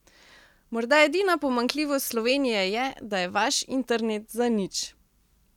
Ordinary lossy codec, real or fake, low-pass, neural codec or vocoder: none; real; 19.8 kHz; none